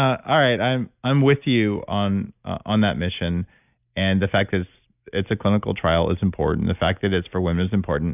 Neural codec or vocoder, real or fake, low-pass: none; real; 3.6 kHz